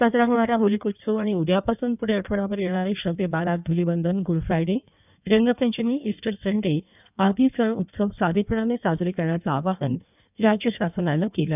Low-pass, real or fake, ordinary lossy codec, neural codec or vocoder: 3.6 kHz; fake; none; codec, 16 kHz in and 24 kHz out, 1.1 kbps, FireRedTTS-2 codec